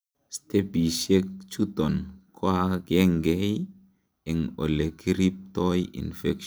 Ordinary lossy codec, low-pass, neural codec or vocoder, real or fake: none; none; none; real